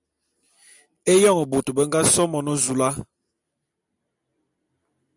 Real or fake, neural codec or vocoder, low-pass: real; none; 10.8 kHz